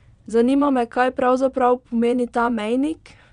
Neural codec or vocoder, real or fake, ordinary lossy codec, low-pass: vocoder, 22.05 kHz, 80 mel bands, Vocos; fake; none; 9.9 kHz